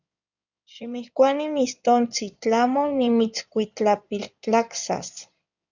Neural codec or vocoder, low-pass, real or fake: codec, 16 kHz in and 24 kHz out, 2.2 kbps, FireRedTTS-2 codec; 7.2 kHz; fake